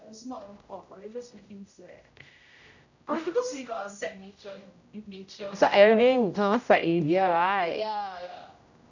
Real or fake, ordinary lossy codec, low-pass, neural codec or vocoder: fake; none; 7.2 kHz; codec, 16 kHz, 0.5 kbps, X-Codec, HuBERT features, trained on general audio